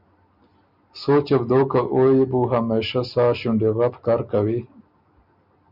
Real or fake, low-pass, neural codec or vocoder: real; 5.4 kHz; none